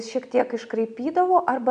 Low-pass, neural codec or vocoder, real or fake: 9.9 kHz; none; real